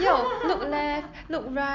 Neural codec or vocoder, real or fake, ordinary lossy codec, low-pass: none; real; none; 7.2 kHz